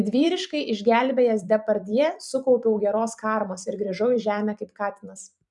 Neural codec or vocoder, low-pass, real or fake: vocoder, 44.1 kHz, 128 mel bands every 256 samples, BigVGAN v2; 10.8 kHz; fake